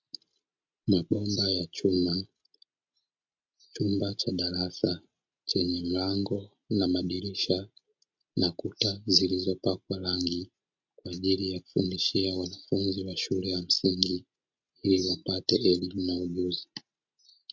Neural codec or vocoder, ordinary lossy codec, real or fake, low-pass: none; MP3, 48 kbps; real; 7.2 kHz